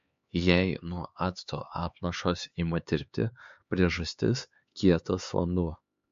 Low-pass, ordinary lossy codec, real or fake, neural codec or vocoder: 7.2 kHz; MP3, 48 kbps; fake; codec, 16 kHz, 4 kbps, X-Codec, HuBERT features, trained on LibriSpeech